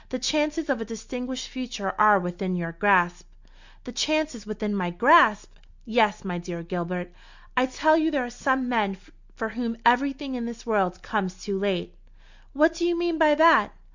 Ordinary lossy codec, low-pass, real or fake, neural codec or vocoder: Opus, 64 kbps; 7.2 kHz; real; none